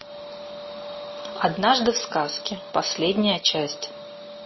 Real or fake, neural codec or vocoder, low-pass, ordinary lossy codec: real; none; 7.2 kHz; MP3, 24 kbps